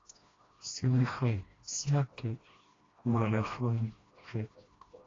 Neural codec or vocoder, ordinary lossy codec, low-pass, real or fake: codec, 16 kHz, 1 kbps, FreqCodec, smaller model; AAC, 32 kbps; 7.2 kHz; fake